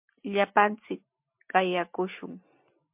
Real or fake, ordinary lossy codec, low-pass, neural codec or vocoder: real; MP3, 32 kbps; 3.6 kHz; none